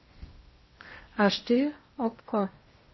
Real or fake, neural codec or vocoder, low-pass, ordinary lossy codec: fake; codec, 16 kHz in and 24 kHz out, 0.8 kbps, FocalCodec, streaming, 65536 codes; 7.2 kHz; MP3, 24 kbps